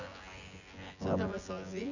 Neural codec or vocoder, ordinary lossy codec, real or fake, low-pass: vocoder, 24 kHz, 100 mel bands, Vocos; none; fake; 7.2 kHz